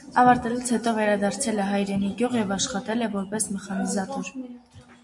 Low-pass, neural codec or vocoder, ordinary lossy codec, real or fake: 10.8 kHz; none; MP3, 96 kbps; real